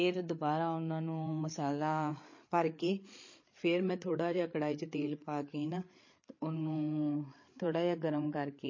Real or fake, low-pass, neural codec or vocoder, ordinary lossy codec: fake; 7.2 kHz; codec, 16 kHz, 8 kbps, FreqCodec, larger model; MP3, 32 kbps